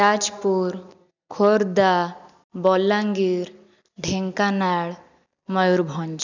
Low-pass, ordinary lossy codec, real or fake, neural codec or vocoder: 7.2 kHz; none; real; none